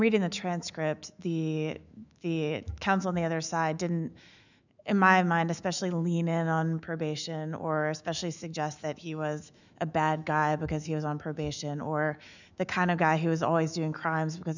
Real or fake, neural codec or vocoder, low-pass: fake; autoencoder, 48 kHz, 128 numbers a frame, DAC-VAE, trained on Japanese speech; 7.2 kHz